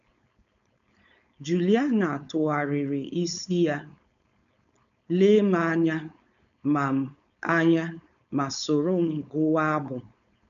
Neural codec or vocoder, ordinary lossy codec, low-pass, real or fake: codec, 16 kHz, 4.8 kbps, FACodec; none; 7.2 kHz; fake